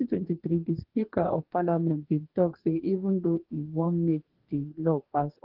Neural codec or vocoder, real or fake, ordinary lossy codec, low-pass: codec, 44.1 kHz, 2.6 kbps, DAC; fake; Opus, 16 kbps; 5.4 kHz